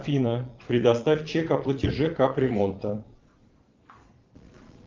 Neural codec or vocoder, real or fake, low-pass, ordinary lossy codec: vocoder, 22.05 kHz, 80 mel bands, Vocos; fake; 7.2 kHz; Opus, 24 kbps